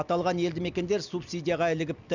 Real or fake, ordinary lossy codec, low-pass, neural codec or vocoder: real; none; 7.2 kHz; none